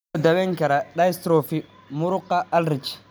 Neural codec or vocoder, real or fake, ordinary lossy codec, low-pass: none; real; none; none